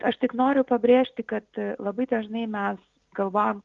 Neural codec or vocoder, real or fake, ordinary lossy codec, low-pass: none; real; Opus, 32 kbps; 7.2 kHz